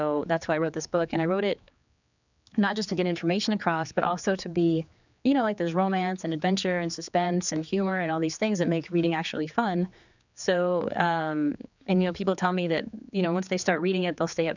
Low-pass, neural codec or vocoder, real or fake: 7.2 kHz; codec, 16 kHz, 4 kbps, X-Codec, HuBERT features, trained on general audio; fake